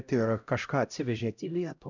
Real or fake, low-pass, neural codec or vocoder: fake; 7.2 kHz; codec, 16 kHz, 0.5 kbps, X-Codec, HuBERT features, trained on LibriSpeech